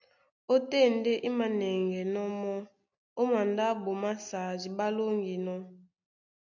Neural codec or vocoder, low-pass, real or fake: none; 7.2 kHz; real